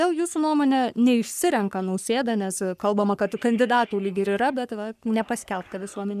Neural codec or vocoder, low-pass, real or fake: codec, 44.1 kHz, 3.4 kbps, Pupu-Codec; 14.4 kHz; fake